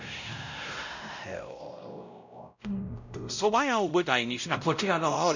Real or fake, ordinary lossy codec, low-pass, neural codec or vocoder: fake; none; 7.2 kHz; codec, 16 kHz, 0.5 kbps, X-Codec, WavLM features, trained on Multilingual LibriSpeech